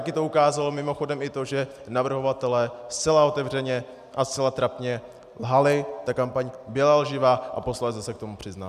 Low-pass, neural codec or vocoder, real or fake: 14.4 kHz; none; real